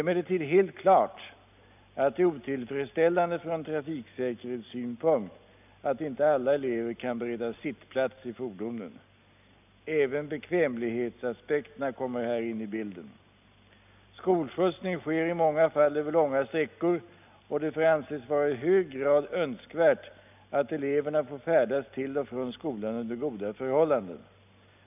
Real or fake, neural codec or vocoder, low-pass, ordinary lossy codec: real; none; 3.6 kHz; none